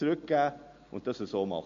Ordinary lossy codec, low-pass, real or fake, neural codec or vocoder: MP3, 48 kbps; 7.2 kHz; real; none